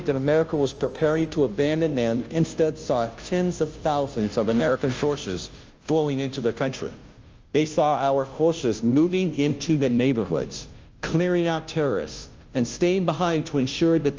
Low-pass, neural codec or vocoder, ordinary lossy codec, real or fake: 7.2 kHz; codec, 16 kHz, 0.5 kbps, FunCodec, trained on Chinese and English, 25 frames a second; Opus, 24 kbps; fake